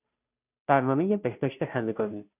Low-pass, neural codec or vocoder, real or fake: 3.6 kHz; codec, 16 kHz, 0.5 kbps, FunCodec, trained on Chinese and English, 25 frames a second; fake